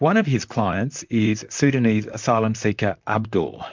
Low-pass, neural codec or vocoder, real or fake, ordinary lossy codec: 7.2 kHz; vocoder, 44.1 kHz, 128 mel bands, Pupu-Vocoder; fake; MP3, 64 kbps